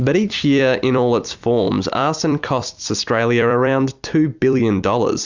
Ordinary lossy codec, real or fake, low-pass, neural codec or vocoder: Opus, 64 kbps; fake; 7.2 kHz; vocoder, 44.1 kHz, 128 mel bands every 256 samples, BigVGAN v2